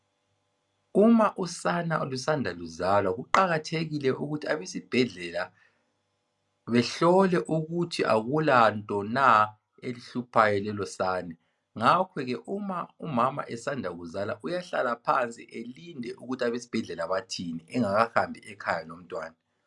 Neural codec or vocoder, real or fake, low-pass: none; real; 10.8 kHz